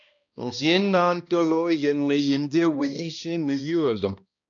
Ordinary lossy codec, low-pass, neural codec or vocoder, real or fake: AAC, 64 kbps; 7.2 kHz; codec, 16 kHz, 1 kbps, X-Codec, HuBERT features, trained on balanced general audio; fake